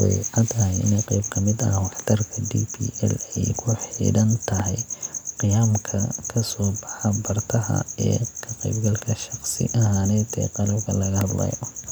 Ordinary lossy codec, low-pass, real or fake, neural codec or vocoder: none; none; real; none